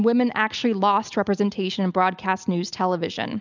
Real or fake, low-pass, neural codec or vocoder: real; 7.2 kHz; none